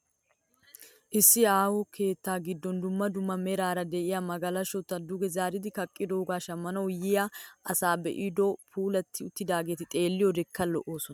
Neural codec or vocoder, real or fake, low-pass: none; real; 19.8 kHz